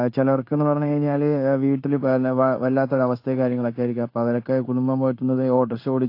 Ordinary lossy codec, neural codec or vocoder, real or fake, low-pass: AAC, 32 kbps; codec, 16 kHz in and 24 kHz out, 1 kbps, XY-Tokenizer; fake; 5.4 kHz